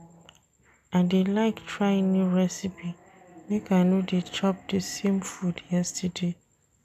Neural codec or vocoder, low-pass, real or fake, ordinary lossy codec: none; 14.4 kHz; real; none